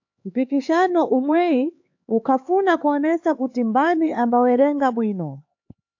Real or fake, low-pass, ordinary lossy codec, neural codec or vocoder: fake; 7.2 kHz; AAC, 48 kbps; codec, 16 kHz, 4 kbps, X-Codec, HuBERT features, trained on LibriSpeech